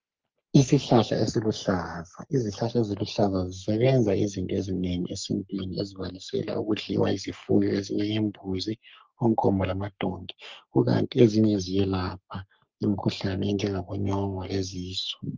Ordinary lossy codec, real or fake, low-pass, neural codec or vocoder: Opus, 24 kbps; fake; 7.2 kHz; codec, 44.1 kHz, 3.4 kbps, Pupu-Codec